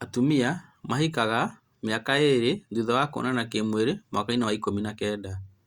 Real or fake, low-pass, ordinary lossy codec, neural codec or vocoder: real; 19.8 kHz; Opus, 64 kbps; none